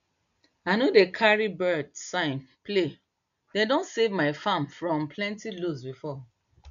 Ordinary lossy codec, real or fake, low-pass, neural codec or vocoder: none; real; 7.2 kHz; none